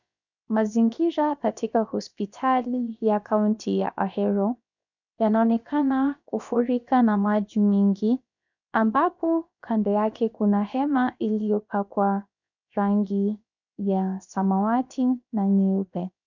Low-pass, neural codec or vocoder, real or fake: 7.2 kHz; codec, 16 kHz, 0.7 kbps, FocalCodec; fake